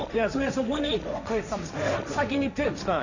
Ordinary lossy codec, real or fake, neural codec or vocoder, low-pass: none; fake; codec, 16 kHz, 1.1 kbps, Voila-Tokenizer; 7.2 kHz